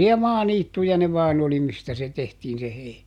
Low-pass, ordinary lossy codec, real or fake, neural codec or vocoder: 19.8 kHz; none; real; none